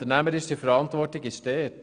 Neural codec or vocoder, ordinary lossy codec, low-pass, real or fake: none; none; 9.9 kHz; real